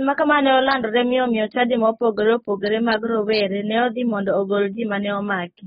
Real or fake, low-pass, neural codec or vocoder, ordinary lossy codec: fake; 19.8 kHz; codec, 44.1 kHz, 7.8 kbps, DAC; AAC, 16 kbps